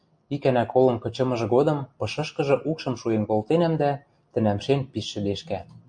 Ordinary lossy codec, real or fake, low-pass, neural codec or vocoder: MP3, 48 kbps; real; 9.9 kHz; none